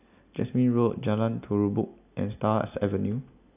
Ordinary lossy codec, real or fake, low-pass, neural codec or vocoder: AAC, 32 kbps; real; 3.6 kHz; none